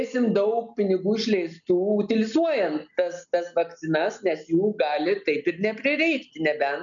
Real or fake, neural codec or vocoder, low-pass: real; none; 7.2 kHz